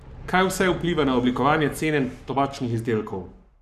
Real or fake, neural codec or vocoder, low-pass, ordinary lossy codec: fake; codec, 44.1 kHz, 7.8 kbps, Pupu-Codec; 14.4 kHz; none